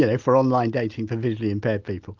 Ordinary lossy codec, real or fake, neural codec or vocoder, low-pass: Opus, 32 kbps; real; none; 7.2 kHz